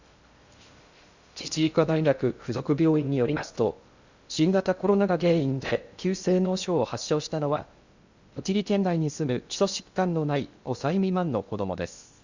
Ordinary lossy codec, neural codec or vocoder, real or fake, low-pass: Opus, 64 kbps; codec, 16 kHz in and 24 kHz out, 0.6 kbps, FocalCodec, streaming, 2048 codes; fake; 7.2 kHz